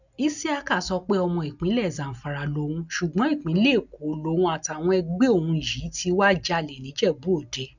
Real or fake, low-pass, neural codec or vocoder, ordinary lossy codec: real; 7.2 kHz; none; none